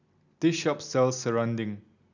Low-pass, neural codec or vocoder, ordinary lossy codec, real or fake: 7.2 kHz; none; none; real